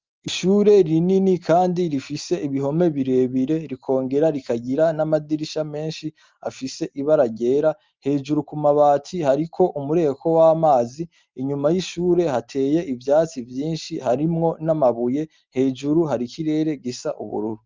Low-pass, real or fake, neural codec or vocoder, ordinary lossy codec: 7.2 kHz; real; none; Opus, 32 kbps